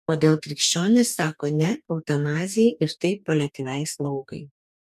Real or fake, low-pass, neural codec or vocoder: fake; 14.4 kHz; codec, 44.1 kHz, 2.6 kbps, DAC